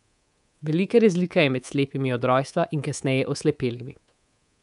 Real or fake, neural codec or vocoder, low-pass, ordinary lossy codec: fake; codec, 24 kHz, 3.1 kbps, DualCodec; 10.8 kHz; none